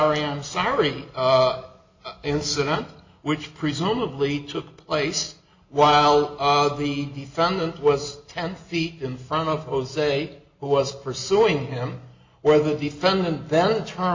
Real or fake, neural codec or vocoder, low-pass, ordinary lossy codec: real; none; 7.2 kHz; MP3, 48 kbps